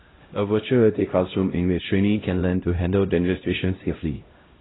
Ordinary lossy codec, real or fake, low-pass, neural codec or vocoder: AAC, 16 kbps; fake; 7.2 kHz; codec, 16 kHz, 0.5 kbps, X-Codec, HuBERT features, trained on LibriSpeech